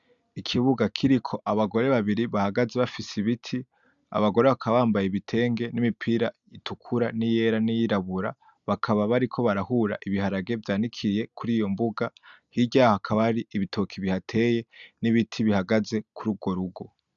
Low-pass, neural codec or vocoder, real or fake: 7.2 kHz; none; real